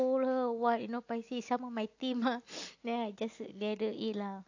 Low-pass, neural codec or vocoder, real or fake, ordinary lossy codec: 7.2 kHz; none; real; AAC, 48 kbps